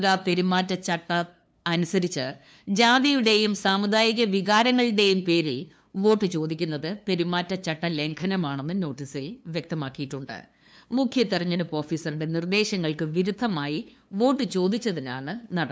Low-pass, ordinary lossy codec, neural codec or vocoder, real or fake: none; none; codec, 16 kHz, 2 kbps, FunCodec, trained on LibriTTS, 25 frames a second; fake